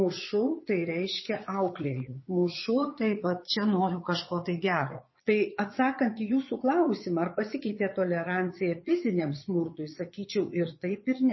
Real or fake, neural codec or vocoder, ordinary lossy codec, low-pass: fake; vocoder, 22.05 kHz, 80 mel bands, Vocos; MP3, 24 kbps; 7.2 kHz